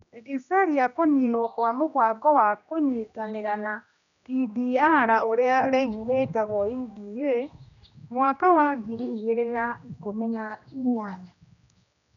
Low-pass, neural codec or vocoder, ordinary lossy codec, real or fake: 7.2 kHz; codec, 16 kHz, 1 kbps, X-Codec, HuBERT features, trained on general audio; none; fake